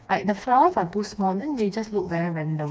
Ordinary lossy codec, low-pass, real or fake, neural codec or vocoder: none; none; fake; codec, 16 kHz, 2 kbps, FreqCodec, smaller model